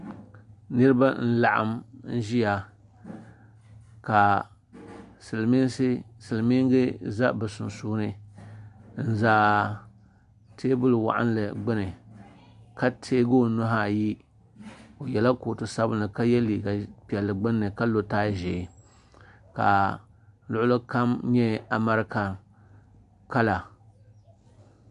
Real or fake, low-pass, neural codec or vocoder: real; 10.8 kHz; none